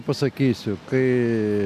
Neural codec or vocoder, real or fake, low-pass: none; real; 14.4 kHz